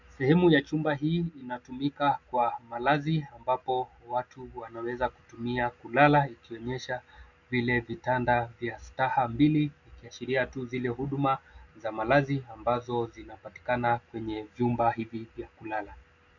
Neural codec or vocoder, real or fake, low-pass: none; real; 7.2 kHz